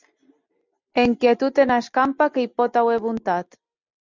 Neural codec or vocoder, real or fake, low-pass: none; real; 7.2 kHz